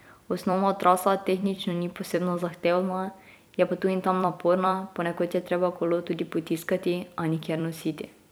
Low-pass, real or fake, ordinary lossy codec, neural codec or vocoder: none; fake; none; vocoder, 44.1 kHz, 128 mel bands every 256 samples, BigVGAN v2